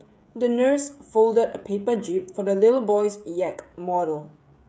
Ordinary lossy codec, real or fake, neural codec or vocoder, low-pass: none; fake; codec, 16 kHz, 16 kbps, FreqCodec, smaller model; none